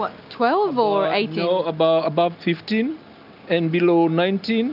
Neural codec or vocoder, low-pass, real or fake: none; 5.4 kHz; real